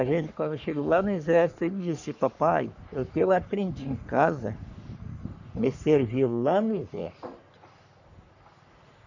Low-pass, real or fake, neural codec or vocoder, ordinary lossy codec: 7.2 kHz; fake; codec, 44.1 kHz, 3.4 kbps, Pupu-Codec; none